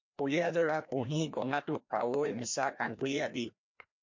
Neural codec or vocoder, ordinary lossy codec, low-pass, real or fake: codec, 16 kHz, 1 kbps, FreqCodec, larger model; MP3, 48 kbps; 7.2 kHz; fake